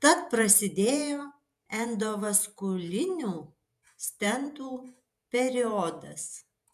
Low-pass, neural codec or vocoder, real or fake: 14.4 kHz; vocoder, 48 kHz, 128 mel bands, Vocos; fake